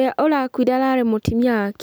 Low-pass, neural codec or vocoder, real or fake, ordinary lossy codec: none; none; real; none